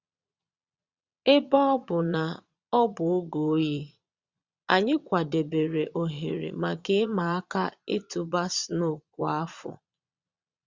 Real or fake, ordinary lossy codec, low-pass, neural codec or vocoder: fake; Opus, 64 kbps; 7.2 kHz; vocoder, 22.05 kHz, 80 mel bands, Vocos